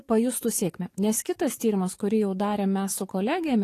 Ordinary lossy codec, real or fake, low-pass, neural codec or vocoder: AAC, 48 kbps; fake; 14.4 kHz; codec, 44.1 kHz, 7.8 kbps, DAC